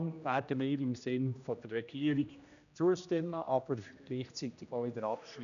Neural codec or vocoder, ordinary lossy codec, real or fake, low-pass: codec, 16 kHz, 1 kbps, X-Codec, HuBERT features, trained on general audio; none; fake; 7.2 kHz